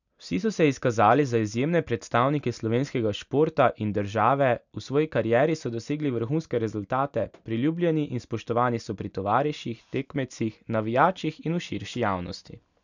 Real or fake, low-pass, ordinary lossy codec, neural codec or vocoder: real; 7.2 kHz; none; none